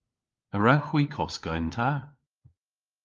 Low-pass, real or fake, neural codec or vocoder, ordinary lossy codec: 7.2 kHz; fake; codec, 16 kHz, 4 kbps, FunCodec, trained on LibriTTS, 50 frames a second; Opus, 32 kbps